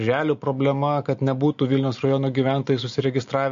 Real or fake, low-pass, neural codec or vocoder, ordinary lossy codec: real; 7.2 kHz; none; MP3, 48 kbps